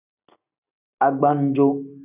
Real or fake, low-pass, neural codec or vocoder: real; 3.6 kHz; none